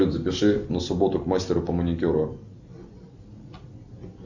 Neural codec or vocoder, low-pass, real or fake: none; 7.2 kHz; real